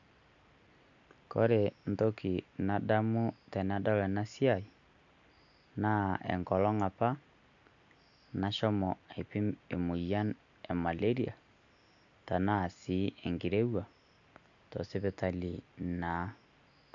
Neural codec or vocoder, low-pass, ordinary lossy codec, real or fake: none; 7.2 kHz; none; real